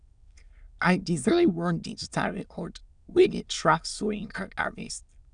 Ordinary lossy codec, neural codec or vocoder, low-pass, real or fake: none; autoencoder, 22.05 kHz, a latent of 192 numbers a frame, VITS, trained on many speakers; 9.9 kHz; fake